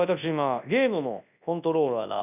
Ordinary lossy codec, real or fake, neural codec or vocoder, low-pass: none; fake; codec, 24 kHz, 0.9 kbps, WavTokenizer, large speech release; 3.6 kHz